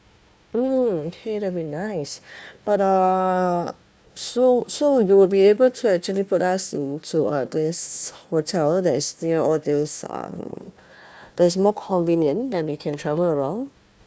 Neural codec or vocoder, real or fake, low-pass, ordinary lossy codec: codec, 16 kHz, 1 kbps, FunCodec, trained on Chinese and English, 50 frames a second; fake; none; none